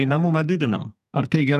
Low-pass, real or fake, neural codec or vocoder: 14.4 kHz; fake; codec, 44.1 kHz, 2.6 kbps, SNAC